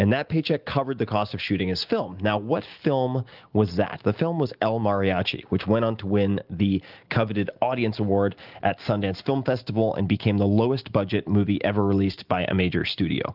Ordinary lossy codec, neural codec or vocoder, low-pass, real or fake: Opus, 32 kbps; none; 5.4 kHz; real